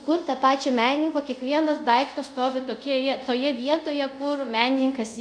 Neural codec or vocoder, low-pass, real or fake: codec, 24 kHz, 0.5 kbps, DualCodec; 9.9 kHz; fake